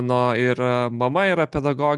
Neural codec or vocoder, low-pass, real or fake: none; 10.8 kHz; real